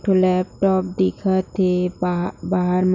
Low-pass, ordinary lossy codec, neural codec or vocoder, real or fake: 7.2 kHz; none; none; real